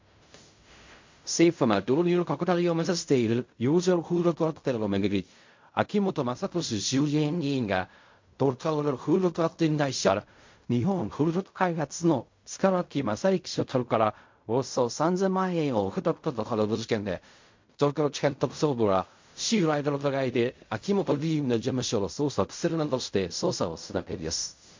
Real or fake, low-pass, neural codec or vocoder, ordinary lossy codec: fake; 7.2 kHz; codec, 16 kHz in and 24 kHz out, 0.4 kbps, LongCat-Audio-Codec, fine tuned four codebook decoder; MP3, 48 kbps